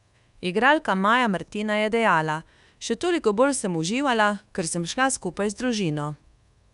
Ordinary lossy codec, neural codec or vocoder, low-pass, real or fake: none; codec, 24 kHz, 1.2 kbps, DualCodec; 10.8 kHz; fake